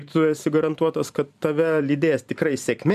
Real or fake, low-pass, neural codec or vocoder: fake; 14.4 kHz; vocoder, 44.1 kHz, 128 mel bands every 512 samples, BigVGAN v2